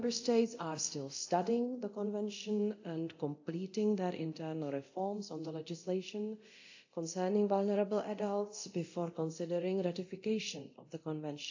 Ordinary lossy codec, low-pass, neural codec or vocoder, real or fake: AAC, 48 kbps; 7.2 kHz; codec, 24 kHz, 0.9 kbps, DualCodec; fake